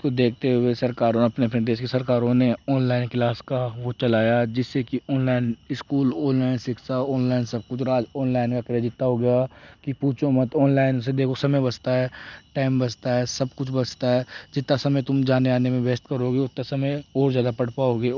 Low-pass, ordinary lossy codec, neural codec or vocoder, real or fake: 7.2 kHz; none; none; real